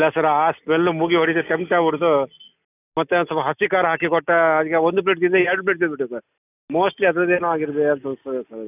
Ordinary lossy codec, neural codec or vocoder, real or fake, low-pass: none; none; real; 3.6 kHz